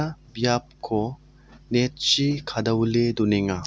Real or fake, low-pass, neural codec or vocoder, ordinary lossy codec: real; none; none; none